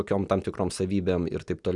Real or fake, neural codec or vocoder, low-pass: real; none; 10.8 kHz